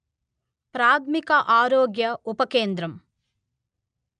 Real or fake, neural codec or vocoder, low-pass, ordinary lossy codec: real; none; 9.9 kHz; MP3, 96 kbps